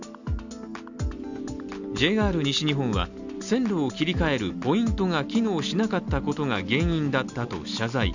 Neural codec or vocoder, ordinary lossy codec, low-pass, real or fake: none; none; 7.2 kHz; real